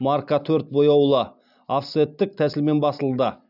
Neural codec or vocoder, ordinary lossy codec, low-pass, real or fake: none; none; 5.4 kHz; real